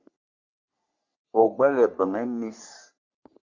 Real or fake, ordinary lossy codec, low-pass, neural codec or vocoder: fake; Opus, 64 kbps; 7.2 kHz; codec, 44.1 kHz, 3.4 kbps, Pupu-Codec